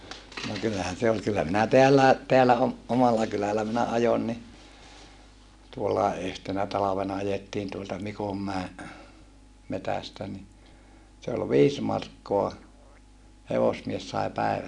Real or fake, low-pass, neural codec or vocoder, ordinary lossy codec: real; 10.8 kHz; none; none